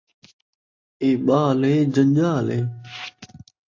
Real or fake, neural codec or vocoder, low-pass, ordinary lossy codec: real; none; 7.2 kHz; AAC, 48 kbps